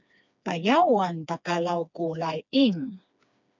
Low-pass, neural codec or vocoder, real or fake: 7.2 kHz; codec, 16 kHz, 2 kbps, FreqCodec, smaller model; fake